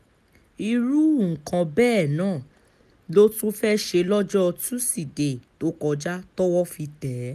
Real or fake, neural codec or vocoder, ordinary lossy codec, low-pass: real; none; none; 14.4 kHz